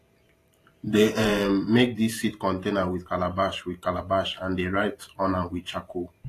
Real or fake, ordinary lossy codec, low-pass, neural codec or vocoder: fake; AAC, 48 kbps; 14.4 kHz; vocoder, 44.1 kHz, 128 mel bands every 512 samples, BigVGAN v2